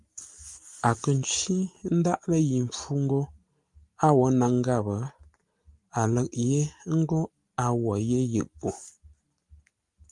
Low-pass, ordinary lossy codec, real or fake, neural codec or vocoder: 10.8 kHz; Opus, 32 kbps; real; none